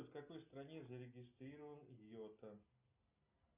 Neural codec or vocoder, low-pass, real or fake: none; 3.6 kHz; real